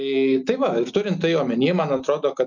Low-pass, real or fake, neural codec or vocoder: 7.2 kHz; real; none